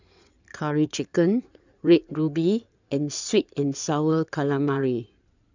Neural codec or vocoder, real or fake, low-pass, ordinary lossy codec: codec, 16 kHz in and 24 kHz out, 2.2 kbps, FireRedTTS-2 codec; fake; 7.2 kHz; none